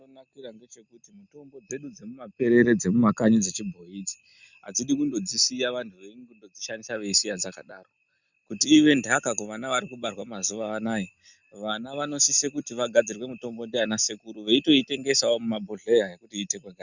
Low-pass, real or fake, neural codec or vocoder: 7.2 kHz; real; none